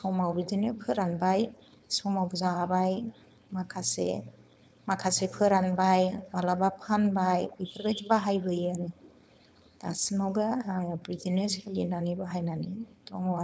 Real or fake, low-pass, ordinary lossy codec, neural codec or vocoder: fake; none; none; codec, 16 kHz, 4.8 kbps, FACodec